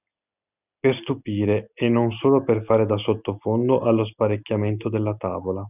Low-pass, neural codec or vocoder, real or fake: 3.6 kHz; none; real